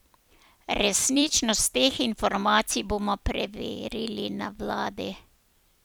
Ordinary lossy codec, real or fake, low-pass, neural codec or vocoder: none; fake; none; vocoder, 44.1 kHz, 128 mel bands every 256 samples, BigVGAN v2